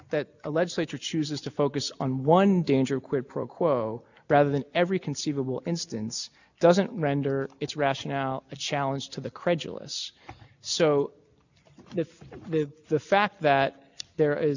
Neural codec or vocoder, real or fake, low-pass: none; real; 7.2 kHz